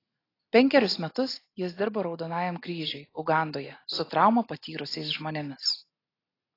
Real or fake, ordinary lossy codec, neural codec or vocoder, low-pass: real; AAC, 24 kbps; none; 5.4 kHz